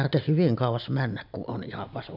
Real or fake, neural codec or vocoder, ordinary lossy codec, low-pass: real; none; none; 5.4 kHz